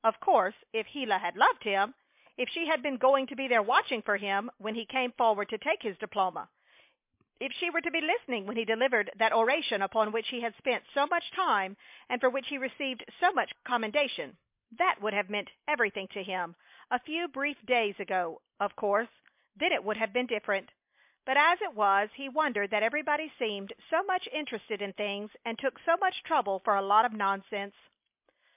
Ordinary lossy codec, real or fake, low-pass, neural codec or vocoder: MP3, 32 kbps; real; 3.6 kHz; none